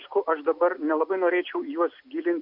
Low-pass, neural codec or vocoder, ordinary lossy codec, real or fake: 5.4 kHz; none; MP3, 48 kbps; real